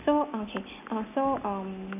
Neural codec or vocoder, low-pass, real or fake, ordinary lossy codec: none; 3.6 kHz; real; none